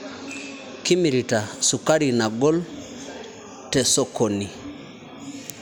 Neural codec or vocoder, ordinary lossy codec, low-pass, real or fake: none; none; none; real